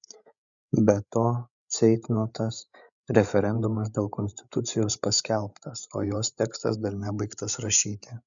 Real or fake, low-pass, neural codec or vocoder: fake; 7.2 kHz; codec, 16 kHz, 8 kbps, FreqCodec, larger model